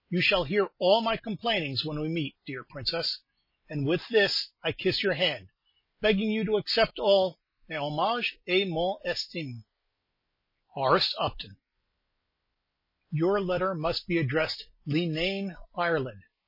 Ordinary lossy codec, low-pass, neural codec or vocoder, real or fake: MP3, 24 kbps; 5.4 kHz; none; real